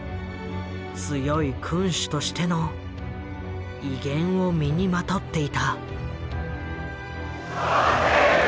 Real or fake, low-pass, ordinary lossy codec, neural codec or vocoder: real; none; none; none